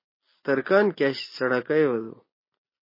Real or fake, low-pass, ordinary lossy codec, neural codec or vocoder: real; 5.4 kHz; MP3, 24 kbps; none